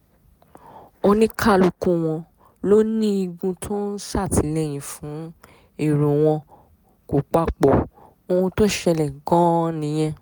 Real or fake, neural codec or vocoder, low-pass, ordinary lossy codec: real; none; none; none